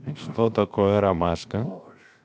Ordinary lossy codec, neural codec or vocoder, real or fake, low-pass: none; codec, 16 kHz, 0.7 kbps, FocalCodec; fake; none